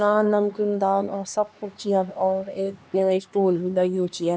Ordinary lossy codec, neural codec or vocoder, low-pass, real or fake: none; codec, 16 kHz, 2 kbps, X-Codec, HuBERT features, trained on LibriSpeech; none; fake